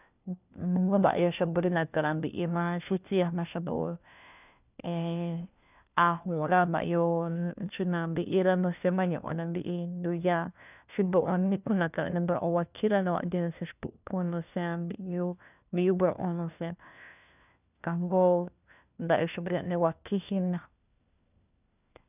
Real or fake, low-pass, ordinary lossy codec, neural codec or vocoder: fake; 3.6 kHz; none; codec, 16 kHz, 1 kbps, FunCodec, trained on LibriTTS, 50 frames a second